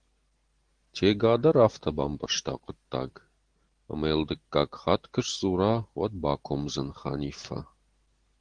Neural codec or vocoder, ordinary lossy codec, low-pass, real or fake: none; Opus, 16 kbps; 9.9 kHz; real